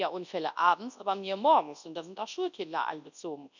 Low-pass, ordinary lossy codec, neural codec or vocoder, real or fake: 7.2 kHz; none; codec, 24 kHz, 0.9 kbps, WavTokenizer, large speech release; fake